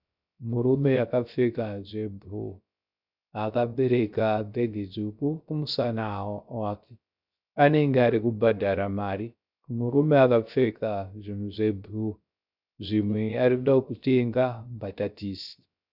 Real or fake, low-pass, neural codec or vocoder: fake; 5.4 kHz; codec, 16 kHz, 0.3 kbps, FocalCodec